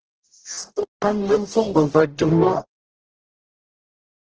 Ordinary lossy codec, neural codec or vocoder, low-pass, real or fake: Opus, 16 kbps; codec, 44.1 kHz, 0.9 kbps, DAC; 7.2 kHz; fake